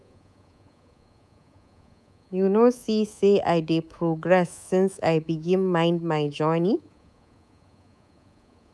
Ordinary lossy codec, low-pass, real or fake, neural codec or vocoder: none; none; fake; codec, 24 kHz, 3.1 kbps, DualCodec